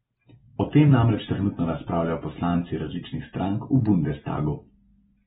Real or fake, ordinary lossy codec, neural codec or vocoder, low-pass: real; AAC, 16 kbps; none; 19.8 kHz